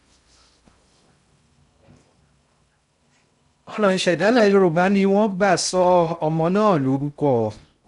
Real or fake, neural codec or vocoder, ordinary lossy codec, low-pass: fake; codec, 16 kHz in and 24 kHz out, 0.6 kbps, FocalCodec, streaming, 2048 codes; none; 10.8 kHz